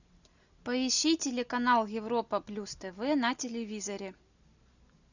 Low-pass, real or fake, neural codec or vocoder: 7.2 kHz; real; none